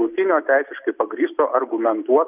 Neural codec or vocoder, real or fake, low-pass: none; real; 3.6 kHz